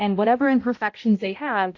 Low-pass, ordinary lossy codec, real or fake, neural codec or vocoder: 7.2 kHz; AAC, 48 kbps; fake; codec, 16 kHz, 0.5 kbps, X-Codec, HuBERT features, trained on balanced general audio